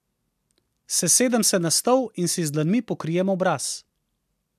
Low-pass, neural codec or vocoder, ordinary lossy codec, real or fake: 14.4 kHz; none; MP3, 96 kbps; real